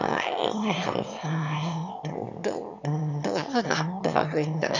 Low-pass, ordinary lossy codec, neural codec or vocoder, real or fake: 7.2 kHz; none; autoencoder, 22.05 kHz, a latent of 192 numbers a frame, VITS, trained on one speaker; fake